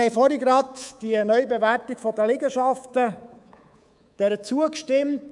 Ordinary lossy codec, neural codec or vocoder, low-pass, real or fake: none; codec, 24 kHz, 3.1 kbps, DualCodec; 10.8 kHz; fake